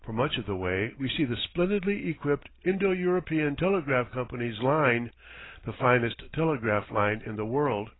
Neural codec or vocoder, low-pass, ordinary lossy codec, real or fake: none; 7.2 kHz; AAC, 16 kbps; real